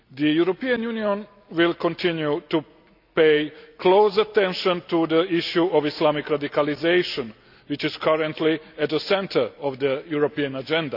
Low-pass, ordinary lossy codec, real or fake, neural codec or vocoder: 5.4 kHz; none; real; none